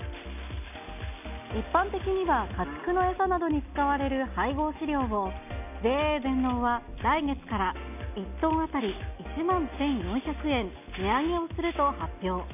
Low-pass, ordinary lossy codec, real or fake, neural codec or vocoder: 3.6 kHz; none; real; none